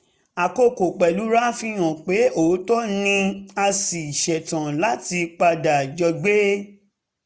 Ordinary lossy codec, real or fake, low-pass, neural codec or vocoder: none; real; none; none